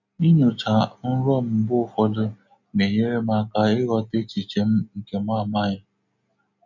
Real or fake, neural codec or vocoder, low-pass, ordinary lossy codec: real; none; 7.2 kHz; none